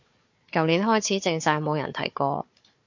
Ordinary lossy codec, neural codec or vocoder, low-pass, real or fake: MP3, 48 kbps; codec, 16 kHz, 4 kbps, FunCodec, trained on Chinese and English, 50 frames a second; 7.2 kHz; fake